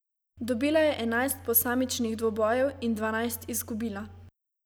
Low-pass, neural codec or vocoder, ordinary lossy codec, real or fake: none; none; none; real